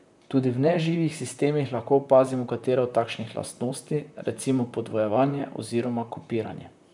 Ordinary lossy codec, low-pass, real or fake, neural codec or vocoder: none; 10.8 kHz; fake; vocoder, 44.1 kHz, 128 mel bands, Pupu-Vocoder